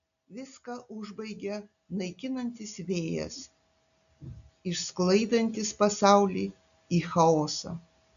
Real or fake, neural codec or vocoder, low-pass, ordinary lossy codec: real; none; 7.2 kHz; MP3, 96 kbps